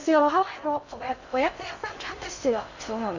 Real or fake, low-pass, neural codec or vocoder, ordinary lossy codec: fake; 7.2 kHz; codec, 16 kHz in and 24 kHz out, 0.6 kbps, FocalCodec, streaming, 2048 codes; none